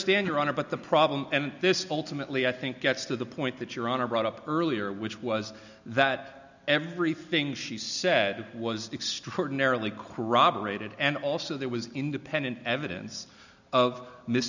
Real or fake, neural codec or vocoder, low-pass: real; none; 7.2 kHz